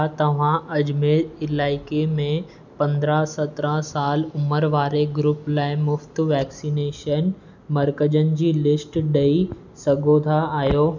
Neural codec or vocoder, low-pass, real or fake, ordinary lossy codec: none; 7.2 kHz; real; none